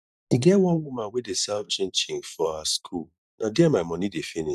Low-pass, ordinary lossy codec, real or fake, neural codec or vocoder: 14.4 kHz; none; fake; vocoder, 44.1 kHz, 128 mel bands every 512 samples, BigVGAN v2